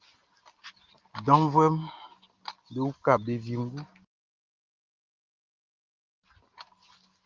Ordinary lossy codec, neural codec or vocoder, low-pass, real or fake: Opus, 32 kbps; none; 7.2 kHz; real